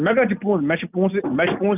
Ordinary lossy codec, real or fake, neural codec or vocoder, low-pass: none; real; none; 3.6 kHz